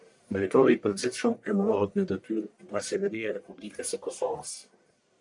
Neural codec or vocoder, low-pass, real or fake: codec, 44.1 kHz, 1.7 kbps, Pupu-Codec; 10.8 kHz; fake